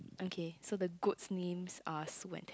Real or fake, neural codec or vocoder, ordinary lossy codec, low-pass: real; none; none; none